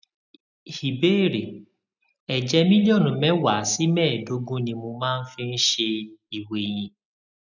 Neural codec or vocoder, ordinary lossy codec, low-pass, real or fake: none; none; 7.2 kHz; real